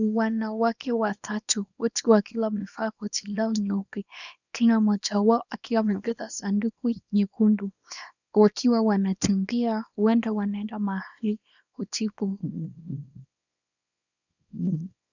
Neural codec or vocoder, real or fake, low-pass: codec, 24 kHz, 0.9 kbps, WavTokenizer, small release; fake; 7.2 kHz